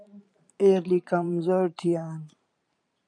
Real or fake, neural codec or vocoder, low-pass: fake; vocoder, 44.1 kHz, 128 mel bands every 512 samples, BigVGAN v2; 9.9 kHz